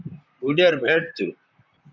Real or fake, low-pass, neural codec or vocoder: fake; 7.2 kHz; vocoder, 44.1 kHz, 128 mel bands, Pupu-Vocoder